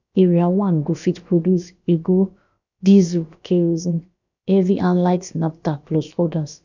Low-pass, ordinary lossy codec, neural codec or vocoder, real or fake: 7.2 kHz; none; codec, 16 kHz, about 1 kbps, DyCAST, with the encoder's durations; fake